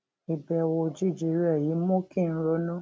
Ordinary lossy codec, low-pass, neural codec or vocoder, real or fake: none; none; none; real